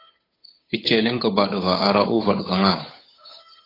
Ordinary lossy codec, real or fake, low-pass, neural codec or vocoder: AAC, 24 kbps; fake; 5.4 kHz; codec, 16 kHz, 8 kbps, FunCodec, trained on Chinese and English, 25 frames a second